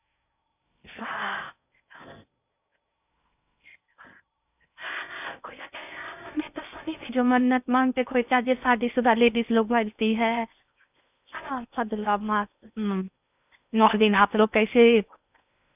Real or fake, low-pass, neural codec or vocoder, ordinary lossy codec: fake; 3.6 kHz; codec, 16 kHz in and 24 kHz out, 0.6 kbps, FocalCodec, streaming, 4096 codes; none